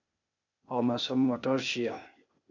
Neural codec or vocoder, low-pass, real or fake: codec, 16 kHz, 0.8 kbps, ZipCodec; 7.2 kHz; fake